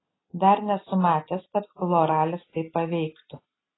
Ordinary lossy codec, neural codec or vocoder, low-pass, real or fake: AAC, 16 kbps; none; 7.2 kHz; real